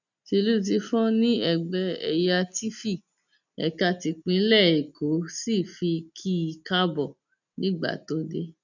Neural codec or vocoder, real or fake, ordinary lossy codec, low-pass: none; real; none; 7.2 kHz